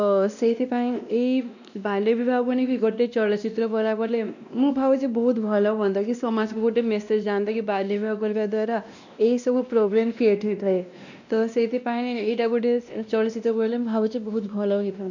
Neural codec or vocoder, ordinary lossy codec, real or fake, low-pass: codec, 16 kHz, 1 kbps, X-Codec, WavLM features, trained on Multilingual LibriSpeech; none; fake; 7.2 kHz